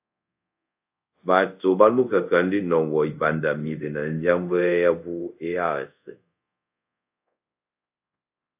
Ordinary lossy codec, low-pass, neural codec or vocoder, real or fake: AAC, 32 kbps; 3.6 kHz; codec, 24 kHz, 0.5 kbps, DualCodec; fake